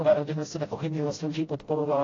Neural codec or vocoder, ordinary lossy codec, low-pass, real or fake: codec, 16 kHz, 0.5 kbps, FreqCodec, smaller model; AAC, 32 kbps; 7.2 kHz; fake